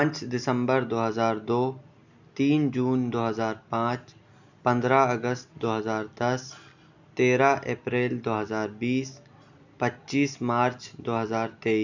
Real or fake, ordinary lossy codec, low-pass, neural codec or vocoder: real; none; 7.2 kHz; none